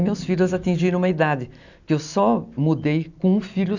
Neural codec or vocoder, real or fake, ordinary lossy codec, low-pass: none; real; none; 7.2 kHz